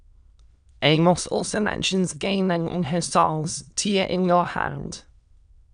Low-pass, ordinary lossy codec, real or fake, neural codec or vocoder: 9.9 kHz; none; fake; autoencoder, 22.05 kHz, a latent of 192 numbers a frame, VITS, trained on many speakers